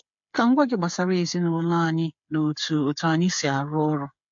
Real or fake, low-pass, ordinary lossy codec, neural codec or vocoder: fake; 7.2 kHz; MP3, 48 kbps; codec, 16 kHz, 8 kbps, FreqCodec, smaller model